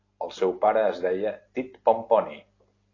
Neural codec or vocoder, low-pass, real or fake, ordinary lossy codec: none; 7.2 kHz; real; AAC, 32 kbps